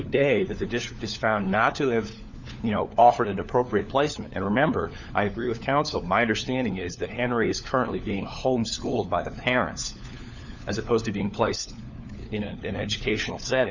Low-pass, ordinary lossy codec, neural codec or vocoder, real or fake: 7.2 kHz; Opus, 64 kbps; codec, 16 kHz, 4 kbps, FunCodec, trained on LibriTTS, 50 frames a second; fake